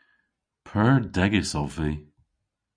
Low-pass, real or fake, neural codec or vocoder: 9.9 kHz; real; none